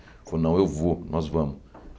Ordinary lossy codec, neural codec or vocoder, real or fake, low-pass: none; none; real; none